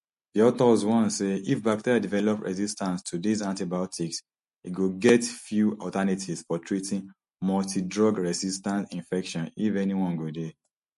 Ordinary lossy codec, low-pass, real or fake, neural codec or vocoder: MP3, 48 kbps; 14.4 kHz; real; none